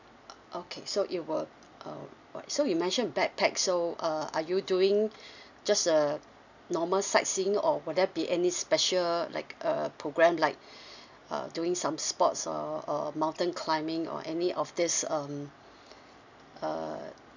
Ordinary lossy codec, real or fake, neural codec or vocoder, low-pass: none; real; none; 7.2 kHz